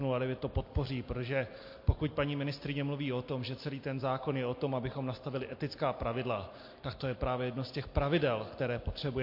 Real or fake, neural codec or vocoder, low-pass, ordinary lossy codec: real; none; 5.4 kHz; MP3, 32 kbps